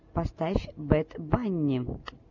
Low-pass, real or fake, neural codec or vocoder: 7.2 kHz; real; none